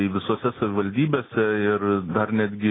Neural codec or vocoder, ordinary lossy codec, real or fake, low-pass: none; AAC, 16 kbps; real; 7.2 kHz